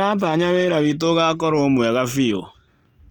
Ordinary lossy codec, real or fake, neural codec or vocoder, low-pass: Opus, 32 kbps; real; none; 19.8 kHz